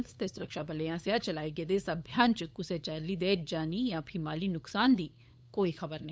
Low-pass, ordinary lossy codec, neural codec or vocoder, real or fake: none; none; codec, 16 kHz, 8 kbps, FunCodec, trained on LibriTTS, 25 frames a second; fake